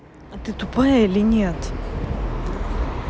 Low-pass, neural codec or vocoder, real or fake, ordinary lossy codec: none; none; real; none